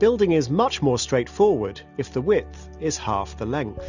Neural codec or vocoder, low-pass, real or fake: none; 7.2 kHz; real